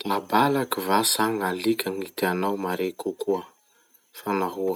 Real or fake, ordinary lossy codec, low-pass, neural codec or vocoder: real; none; none; none